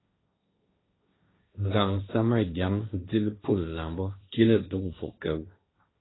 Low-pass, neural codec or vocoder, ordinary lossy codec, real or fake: 7.2 kHz; codec, 16 kHz, 1.1 kbps, Voila-Tokenizer; AAC, 16 kbps; fake